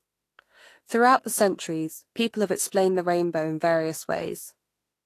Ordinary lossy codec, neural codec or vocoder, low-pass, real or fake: AAC, 48 kbps; autoencoder, 48 kHz, 32 numbers a frame, DAC-VAE, trained on Japanese speech; 14.4 kHz; fake